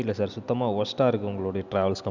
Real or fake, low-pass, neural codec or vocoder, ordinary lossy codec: real; 7.2 kHz; none; none